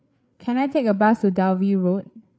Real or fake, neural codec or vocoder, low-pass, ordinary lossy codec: fake; codec, 16 kHz, 8 kbps, FreqCodec, larger model; none; none